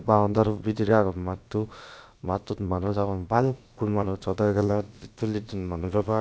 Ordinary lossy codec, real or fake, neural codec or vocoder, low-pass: none; fake; codec, 16 kHz, about 1 kbps, DyCAST, with the encoder's durations; none